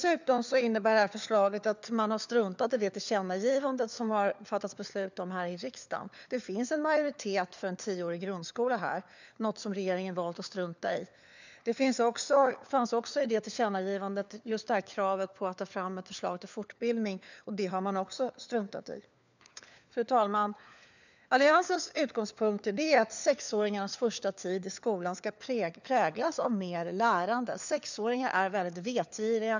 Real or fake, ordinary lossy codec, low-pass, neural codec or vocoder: fake; none; 7.2 kHz; codec, 16 kHz, 4 kbps, FunCodec, trained on LibriTTS, 50 frames a second